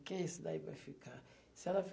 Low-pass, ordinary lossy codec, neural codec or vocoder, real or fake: none; none; none; real